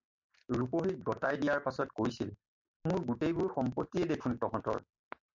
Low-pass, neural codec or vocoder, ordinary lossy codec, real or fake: 7.2 kHz; none; MP3, 64 kbps; real